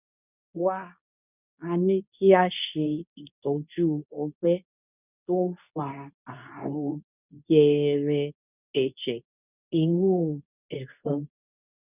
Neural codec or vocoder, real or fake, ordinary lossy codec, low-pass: codec, 24 kHz, 0.9 kbps, WavTokenizer, medium speech release version 2; fake; none; 3.6 kHz